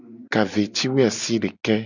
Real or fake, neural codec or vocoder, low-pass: real; none; 7.2 kHz